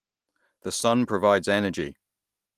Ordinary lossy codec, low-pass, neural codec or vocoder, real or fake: Opus, 24 kbps; 14.4 kHz; none; real